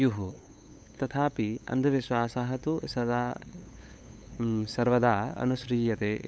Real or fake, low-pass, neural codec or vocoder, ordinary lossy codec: fake; none; codec, 16 kHz, 8 kbps, FunCodec, trained on LibriTTS, 25 frames a second; none